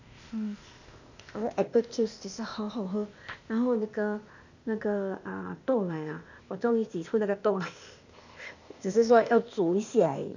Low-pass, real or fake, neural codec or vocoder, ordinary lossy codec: 7.2 kHz; fake; codec, 16 kHz, 0.8 kbps, ZipCodec; none